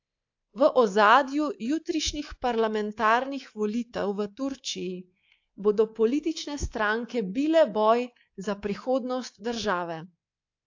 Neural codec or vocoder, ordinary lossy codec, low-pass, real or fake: codec, 24 kHz, 3.1 kbps, DualCodec; AAC, 48 kbps; 7.2 kHz; fake